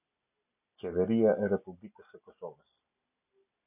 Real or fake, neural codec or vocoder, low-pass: real; none; 3.6 kHz